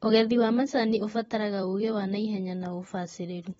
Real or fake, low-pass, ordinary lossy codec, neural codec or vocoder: fake; 19.8 kHz; AAC, 24 kbps; vocoder, 44.1 kHz, 128 mel bands every 256 samples, BigVGAN v2